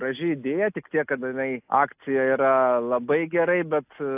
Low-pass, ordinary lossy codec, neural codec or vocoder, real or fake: 3.6 kHz; AAC, 32 kbps; none; real